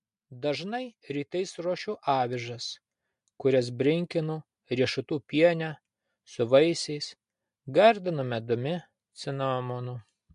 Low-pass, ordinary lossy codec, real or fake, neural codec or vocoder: 10.8 kHz; MP3, 64 kbps; real; none